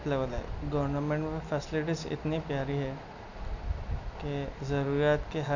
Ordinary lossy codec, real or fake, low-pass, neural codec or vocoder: none; real; 7.2 kHz; none